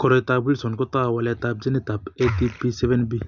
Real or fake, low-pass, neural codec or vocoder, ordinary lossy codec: real; 7.2 kHz; none; none